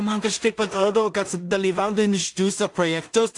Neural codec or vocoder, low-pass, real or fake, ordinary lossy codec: codec, 16 kHz in and 24 kHz out, 0.4 kbps, LongCat-Audio-Codec, two codebook decoder; 10.8 kHz; fake; AAC, 64 kbps